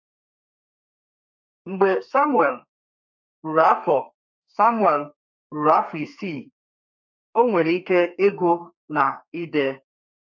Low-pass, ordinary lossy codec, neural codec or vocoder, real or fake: 7.2 kHz; MP3, 48 kbps; codec, 32 kHz, 1.9 kbps, SNAC; fake